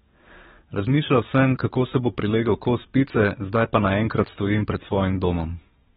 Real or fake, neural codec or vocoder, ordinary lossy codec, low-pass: fake; codec, 44.1 kHz, 7.8 kbps, DAC; AAC, 16 kbps; 19.8 kHz